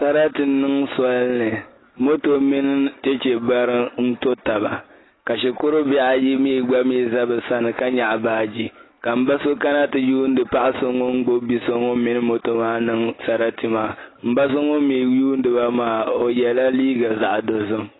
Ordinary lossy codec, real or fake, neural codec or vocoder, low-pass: AAC, 16 kbps; real; none; 7.2 kHz